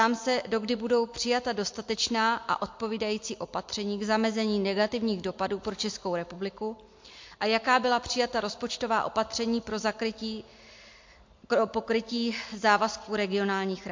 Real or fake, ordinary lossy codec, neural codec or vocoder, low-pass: real; MP3, 48 kbps; none; 7.2 kHz